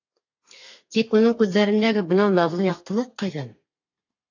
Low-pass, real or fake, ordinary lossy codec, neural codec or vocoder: 7.2 kHz; fake; AAC, 48 kbps; codec, 32 kHz, 1.9 kbps, SNAC